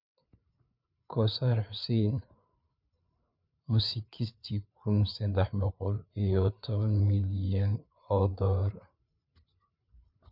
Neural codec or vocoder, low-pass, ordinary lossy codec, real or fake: codec, 16 kHz, 4 kbps, FreqCodec, larger model; 5.4 kHz; none; fake